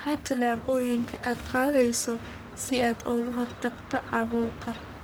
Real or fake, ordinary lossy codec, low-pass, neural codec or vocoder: fake; none; none; codec, 44.1 kHz, 1.7 kbps, Pupu-Codec